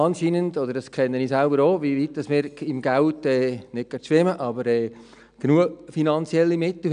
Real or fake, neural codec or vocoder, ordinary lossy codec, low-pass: real; none; none; 9.9 kHz